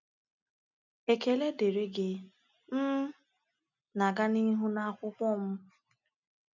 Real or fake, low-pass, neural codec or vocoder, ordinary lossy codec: real; 7.2 kHz; none; none